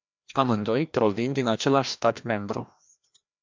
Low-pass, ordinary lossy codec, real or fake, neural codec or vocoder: 7.2 kHz; MP3, 64 kbps; fake; codec, 16 kHz, 1 kbps, FreqCodec, larger model